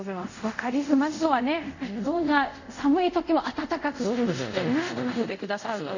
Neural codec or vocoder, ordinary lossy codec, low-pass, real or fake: codec, 24 kHz, 0.5 kbps, DualCodec; none; 7.2 kHz; fake